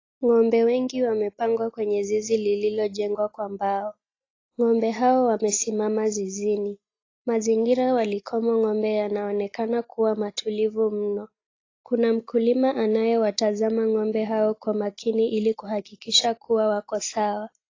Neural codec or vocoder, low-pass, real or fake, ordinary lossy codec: none; 7.2 kHz; real; AAC, 32 kbps